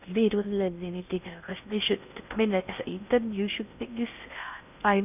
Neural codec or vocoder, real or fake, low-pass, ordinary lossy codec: codec, 16 kHz in and 24 kHz out, 0.6 kbps, FocalCodec, streaming, 2048 codes; fake; 3.6 kHz; none